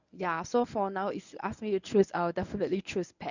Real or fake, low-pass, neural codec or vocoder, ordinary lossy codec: fake; 7.2 kHz; codec, 24 kHz, 0.9 kbps, WavTokenizer, medium speech release version 1; none